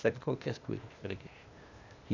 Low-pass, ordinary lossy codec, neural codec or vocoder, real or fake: 7.2 kHz; none; codec, 16 kHz, 0.8 kbps, ZipCodec; fake